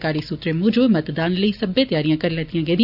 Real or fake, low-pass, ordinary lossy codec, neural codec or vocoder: real; 5.4 kHz; none; none